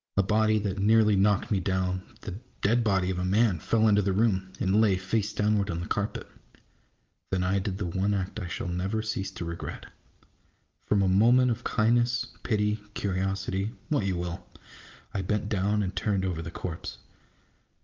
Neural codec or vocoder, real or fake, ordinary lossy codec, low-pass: none; real; Opus, 24 kbps; 7.2 kHz